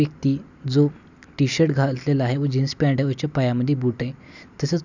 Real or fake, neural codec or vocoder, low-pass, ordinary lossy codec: real; none; 7.2 kHz; none